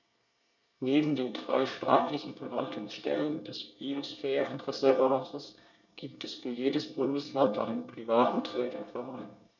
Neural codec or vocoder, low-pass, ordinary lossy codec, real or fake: codec, 24 kHz, 1 kbps, SNAC; 7.2 kHz; none; fake